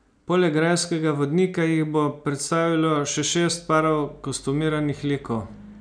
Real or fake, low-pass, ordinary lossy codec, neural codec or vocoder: real; 9.9 kHz; none; none